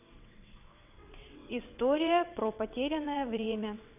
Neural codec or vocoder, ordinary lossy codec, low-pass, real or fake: vocoder, 22.05 kHz, 80 mel bands, WaveNeXt; none; 3.6 kHz; fake